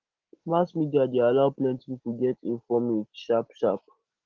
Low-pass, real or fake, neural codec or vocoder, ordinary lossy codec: 7.2 kHz; real; none; Opus, 16 kbps